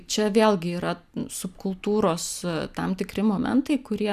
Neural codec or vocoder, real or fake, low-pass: none; real; 14.4 kHz